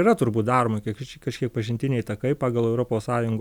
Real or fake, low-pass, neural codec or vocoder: real; 19.8 kHz; none